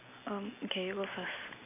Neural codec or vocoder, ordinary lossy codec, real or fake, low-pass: none; none; real; 3.6 kHz